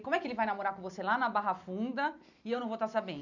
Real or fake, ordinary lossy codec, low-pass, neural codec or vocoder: real; none; 7.2 kHz; none